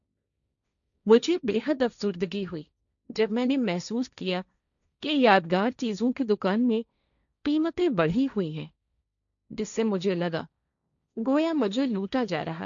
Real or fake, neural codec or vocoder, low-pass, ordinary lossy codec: fake; codec, 16 kHz, 1.1 kbps, Voila-Tokenizer; 7.2 kHz; none